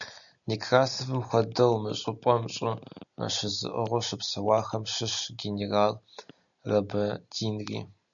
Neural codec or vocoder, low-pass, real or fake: none; 7.2 kHz; real